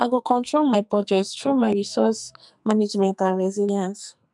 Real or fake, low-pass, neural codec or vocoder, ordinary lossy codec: fake; 10.8 kHz; codec, 44.1 kHz, 2.6 kbps, SNAC; none